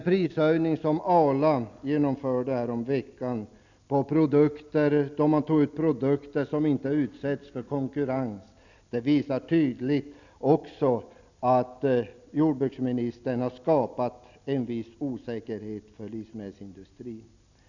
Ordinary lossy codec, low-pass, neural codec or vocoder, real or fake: none; 7.2 kHz; none; real